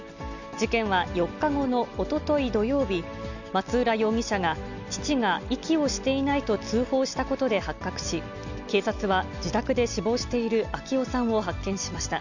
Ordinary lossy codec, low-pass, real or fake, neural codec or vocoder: none; 7.2 kHz; real; none